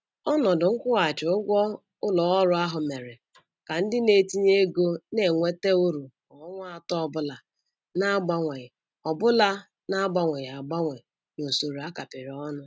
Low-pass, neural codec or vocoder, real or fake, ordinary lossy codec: none; none; real; none